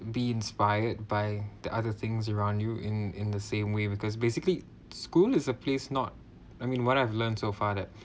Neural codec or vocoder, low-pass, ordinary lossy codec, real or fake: none; none; none; real